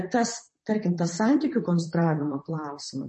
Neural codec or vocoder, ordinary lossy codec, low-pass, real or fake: vocoder, 44.1 kHz, 128 mel bands, Pupu-Vocoder; MP3, 32 kbps; 10.8 kHz; fake